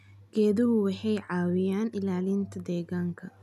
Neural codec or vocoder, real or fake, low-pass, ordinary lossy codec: none; real; 14.4 kHz; none